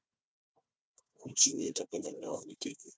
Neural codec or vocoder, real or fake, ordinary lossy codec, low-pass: codec, 16 kHz, 1 kbps, FunCodec, trained on Chinese and English, 50 frames a second; fake; none; none